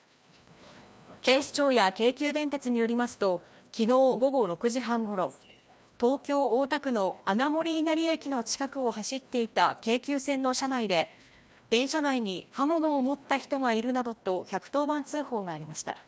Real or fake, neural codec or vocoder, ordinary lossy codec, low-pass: fake; codec, 16 kHz, 1 kbps, FreqCodec, larger model; none; none